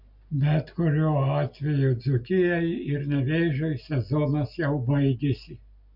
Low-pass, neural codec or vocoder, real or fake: 5.4 kHz; none; real